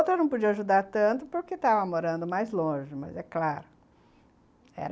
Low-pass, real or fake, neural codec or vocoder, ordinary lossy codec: none; real; none; none